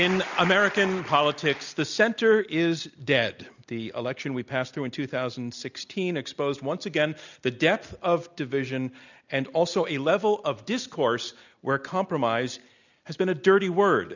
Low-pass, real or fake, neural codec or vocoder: 7.2 kHz; real; none